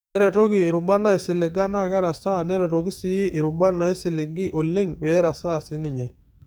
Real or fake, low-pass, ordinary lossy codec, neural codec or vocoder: fake; none; none; codec, 44.1 kHz, 2.6 kbps, SNAC